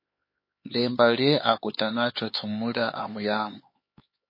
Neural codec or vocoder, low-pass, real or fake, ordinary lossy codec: codec, 16 kHz, 4 kbps, X-Codec, HuBERT features, trained on LibriSpeech; 5.4 kHz; fake; MP3, 24 kbps